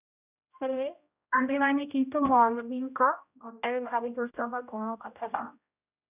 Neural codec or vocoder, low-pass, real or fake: codec, 16 kHz, 0.5 kbps, X-Codec, HuBERT features, trained on general audio; 3.6 kHz; fake